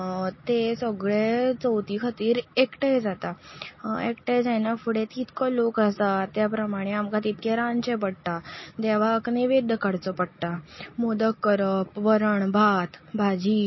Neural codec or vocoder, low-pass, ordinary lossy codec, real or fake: none; 7.2 kHz; MP3, 24 kbps; real